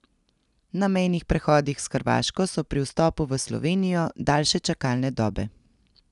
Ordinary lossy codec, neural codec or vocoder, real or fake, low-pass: none; none; real; 10.8 kHz